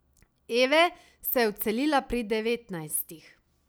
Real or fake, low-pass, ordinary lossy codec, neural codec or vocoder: real; none; none; none